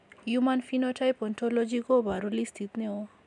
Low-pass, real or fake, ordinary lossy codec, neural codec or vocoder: 10.8 kHz; real; none; none